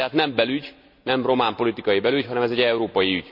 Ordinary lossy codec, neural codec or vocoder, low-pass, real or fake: none; none; 5.4 kHz; real